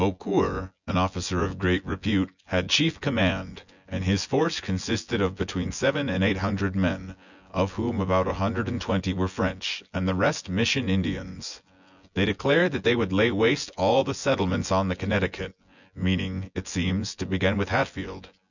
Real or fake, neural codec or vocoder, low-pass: fake; vocoder, 24 kHz, 100 mel bands, Vocos; 7.2 kHz